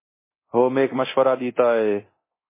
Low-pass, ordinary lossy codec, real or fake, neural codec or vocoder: 3.6 kHz; MP3, 16 kbps; fake; codec, 24 kHz, 0.9 kbps, DualCodec